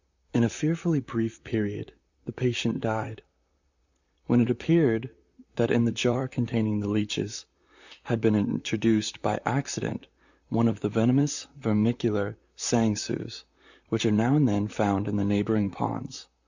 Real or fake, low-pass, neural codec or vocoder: fake; 7.2 kHz; vocoder, 44.1 kHz, 128 mel bands, Pupu-Vocoder